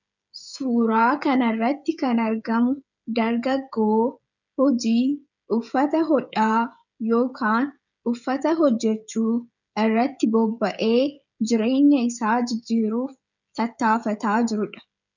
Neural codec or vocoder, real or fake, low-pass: codec, 16 kHz, 16 kbps, FreqCodec, smaller model; fake; 7.2 kHz